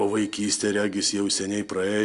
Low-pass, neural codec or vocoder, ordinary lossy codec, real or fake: 10.8 kHz; none; AAC, 64 kbps; real